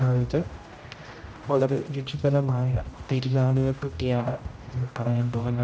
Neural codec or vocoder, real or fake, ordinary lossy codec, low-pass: codec, 16 kHz, 0.5 kbps, X-Codec, HuBERT features, trained on general audio; fake; none; none